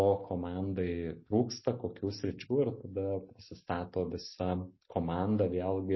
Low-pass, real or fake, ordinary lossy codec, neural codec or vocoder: 7.2 kHz; real; MP3, 24 kbps; none